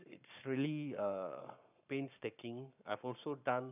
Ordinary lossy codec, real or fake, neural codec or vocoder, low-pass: none; fake; codec, 44.1 kHz, 7.8 kbps, Pupu-Codec; 3.6 kHz